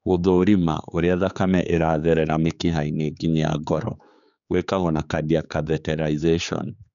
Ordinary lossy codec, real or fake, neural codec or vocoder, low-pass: none; fake; codec, 16 kHz, 4 kbps, X-Codec, HuBERT features, trained on general audio; 7.2 kHz